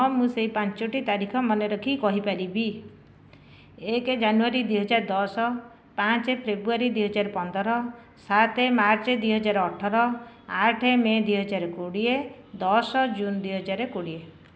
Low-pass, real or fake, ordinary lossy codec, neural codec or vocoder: none; real; none; none